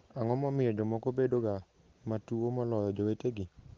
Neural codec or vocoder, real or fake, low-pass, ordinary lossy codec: none; real; 7.2 kHz; Opus, 16 kbps